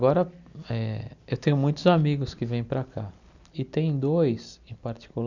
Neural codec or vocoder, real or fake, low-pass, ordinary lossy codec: none; real; 7.2 kHz; none